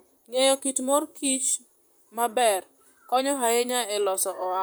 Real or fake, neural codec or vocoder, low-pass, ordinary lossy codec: fake; vocoder, 44.1 kHz, 128 mel bands, Pupu-Vocoder; none; none